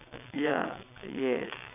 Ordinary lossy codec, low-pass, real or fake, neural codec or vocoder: none; 3.6 kHz; fake; vocoder, 22.05 kHz, 80 mel bands, WaveNeXt